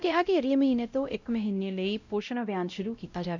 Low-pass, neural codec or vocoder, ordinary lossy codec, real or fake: 7.2 kHz; codec, 16 kHz, 0.5 kbps, X-Codec, WavLM features, trained on Multilingual LibriSpeech; none; fake